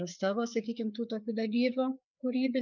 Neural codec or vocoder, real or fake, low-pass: codec, 16 kHz, 4 kbps, FreqCodec, larger model; fake; 7.2 kHz